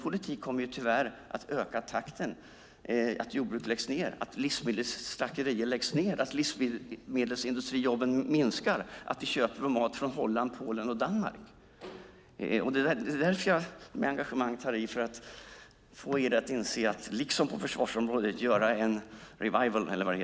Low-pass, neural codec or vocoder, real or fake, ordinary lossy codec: none; none; real; none